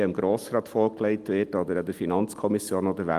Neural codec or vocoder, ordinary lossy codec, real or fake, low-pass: none; Opus, 32 kbps; real; 14.4 kHz